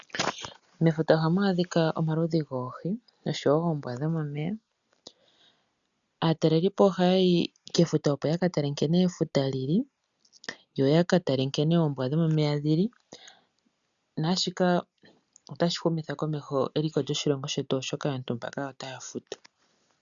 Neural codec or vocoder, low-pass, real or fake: none; 7.2 kHz; real